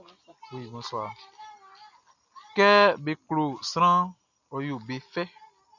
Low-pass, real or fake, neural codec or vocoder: 7.2 kHz; real; none